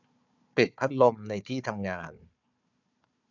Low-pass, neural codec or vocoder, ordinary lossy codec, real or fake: 7.2 kHz; codec, 16 kHz, 4 kbps, FunCodec, trained on Chinese and English, 50 frames a second; none; fake